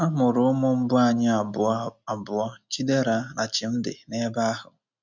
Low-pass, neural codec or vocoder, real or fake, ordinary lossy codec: 7.2 kHz; none; real; none